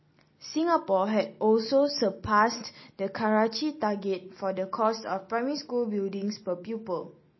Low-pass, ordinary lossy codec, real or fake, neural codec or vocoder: 7.2 kHz; MP3, 24 kbps; real; none